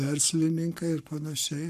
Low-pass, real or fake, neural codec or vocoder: 14.4 kHz; fake; codec, 44.1 kHz, 7.8 kbps, Pupu-Codec